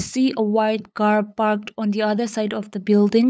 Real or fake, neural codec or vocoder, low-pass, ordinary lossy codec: fake; codec, 16 kHz, 8 kbps, FunCodec, trained on LibriTTS, 25 frames a second; none; none